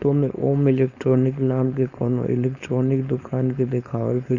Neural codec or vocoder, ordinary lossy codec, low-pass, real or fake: codec, 16 kHz, 4.8 kbps, FACodec; none; 7.2 kHz; fake